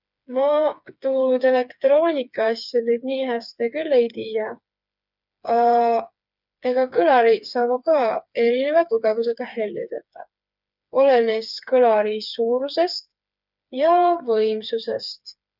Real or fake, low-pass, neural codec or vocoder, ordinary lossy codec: fake; 5.4 kHz; codec, 16 kHz, 4 kbps, FreqCodec, smaller model; none